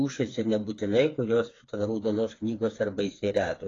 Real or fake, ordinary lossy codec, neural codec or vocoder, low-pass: fake; AAC, 32 kbps; codec, 16 kHz, 4 kbps, FreqCodec, smaller model; 7.2 kHz